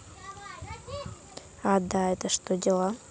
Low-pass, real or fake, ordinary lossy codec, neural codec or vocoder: none; real; none; none